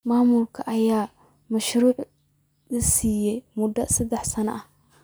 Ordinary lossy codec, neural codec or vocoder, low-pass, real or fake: none; none; none; real